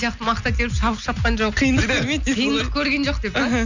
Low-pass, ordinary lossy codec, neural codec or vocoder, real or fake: 7.2 kHz; none; none; real